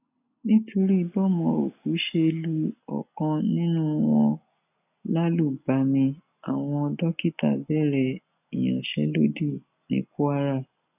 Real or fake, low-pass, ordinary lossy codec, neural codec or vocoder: real; 3.6 kHz; none; none